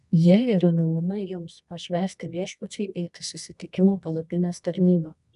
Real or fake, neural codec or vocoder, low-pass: fake; codec, 24 kHz, 0.9 kbps, WavTokenizer, medium music audio release; 10.8 kHz